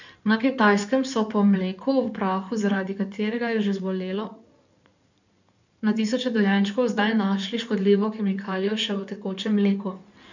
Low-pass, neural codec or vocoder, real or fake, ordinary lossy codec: 7.2 kHz; codec, 16 kHz in and 24 kHz out, 2.2 kbps, FireRedTTS-2 codec; fake; none